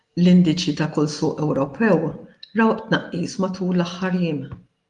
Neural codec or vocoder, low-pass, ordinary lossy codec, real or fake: none; 10.8 kHz; Opus, 24 kbps; real